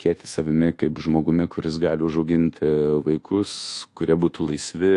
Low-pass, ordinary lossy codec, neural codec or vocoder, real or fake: 10.8 kHz; AAC, 48 kbps; codec, 24 kHz, 1.2 kbps, DualCodec; fake